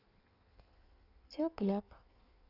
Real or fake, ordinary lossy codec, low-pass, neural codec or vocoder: fake; none; 5.4 kHz; codec, 16 kHz in and 24 kHz out, 1.1 kbps, FireRedTTS-2 codec